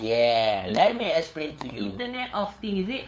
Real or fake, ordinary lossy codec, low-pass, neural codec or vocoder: fake; none; none; codec, 16 kHz, 8 kbps, FunCodec, trained on LibriTTS, 25 frames a second